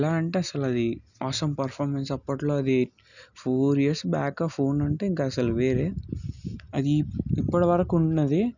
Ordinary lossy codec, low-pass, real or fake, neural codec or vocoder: none; 7.2 kHz; real; none